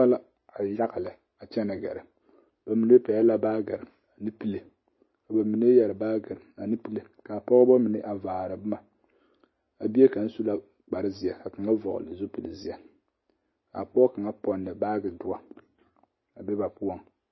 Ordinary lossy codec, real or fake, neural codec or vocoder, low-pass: MP3, 24 kbps; real; none; 7.2 kHz